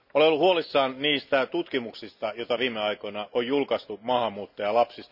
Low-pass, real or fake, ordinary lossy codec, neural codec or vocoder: 5.4 kHz; real; none; none